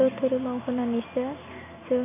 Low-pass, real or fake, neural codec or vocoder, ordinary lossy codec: 3.6 kHz; real; none; none